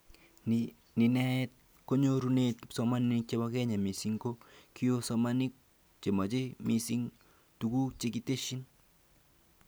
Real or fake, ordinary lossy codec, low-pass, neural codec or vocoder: real; none; none; none